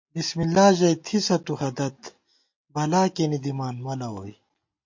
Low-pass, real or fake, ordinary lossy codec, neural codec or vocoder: 7.2 kHz; real; MP3, 48 kbps; none